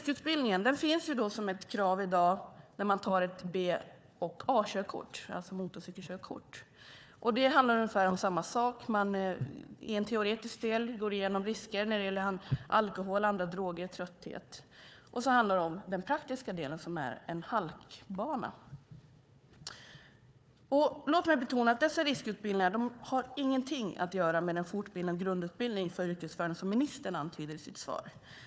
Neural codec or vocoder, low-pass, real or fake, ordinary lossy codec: codec, 16 kHz, 4 kbps, FunCodec, trained on Chinese and English, 50 frames a second; none; fake; none